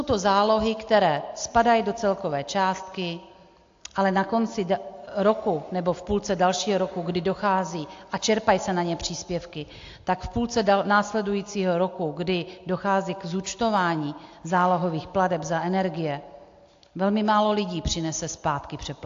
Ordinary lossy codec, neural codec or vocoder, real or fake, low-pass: AAC, 48 kbps; none; real; 7.2 kHz